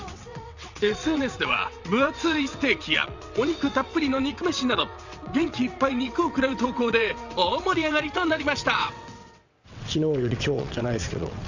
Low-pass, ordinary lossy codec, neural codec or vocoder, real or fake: 7.2 kHz; none; vocoder, 22.05 kHz, 80 mel bands, WaveNeXt; fake